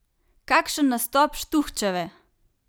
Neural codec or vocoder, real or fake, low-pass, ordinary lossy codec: none; real; none; none